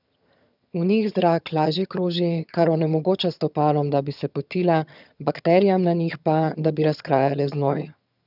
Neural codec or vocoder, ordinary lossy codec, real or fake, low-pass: vocoder, 22.05 kHz, 80 mel bands, HiFi-GAN; none; fake; 5.4 kHz